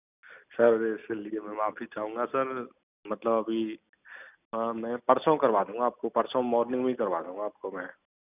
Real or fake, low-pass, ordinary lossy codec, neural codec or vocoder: real; 3.6 kHz; none; none